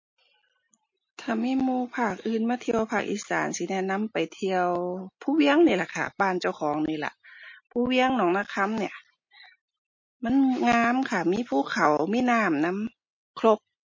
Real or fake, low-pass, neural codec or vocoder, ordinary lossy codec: real; 7.2 kHz; none; MP3, 32 kbps